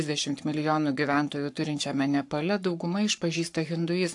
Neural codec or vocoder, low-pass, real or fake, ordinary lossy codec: codec, 44.1 kHz, 7.8 kbps, Pupu-Codec; 10.8 kHz; fake; AAC, 64 kbps